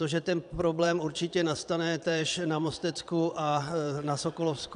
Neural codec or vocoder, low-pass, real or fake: vocoder, 22.05 kHz, 80 mel bands, Vocos; 9.9 kHz; fake